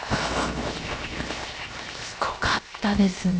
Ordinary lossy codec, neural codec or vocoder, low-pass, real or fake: none; codec, 16 kHz, 0.7 kbps, FocalCodec; none; fake